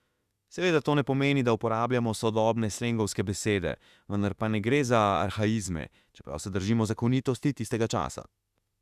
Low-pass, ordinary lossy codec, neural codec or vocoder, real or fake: 14.4 kHz; Opus, 64 kbps; autoencoder, 48 kHz, 32 numbers a frame, DAC-VAE, trained on Japanese speech; fake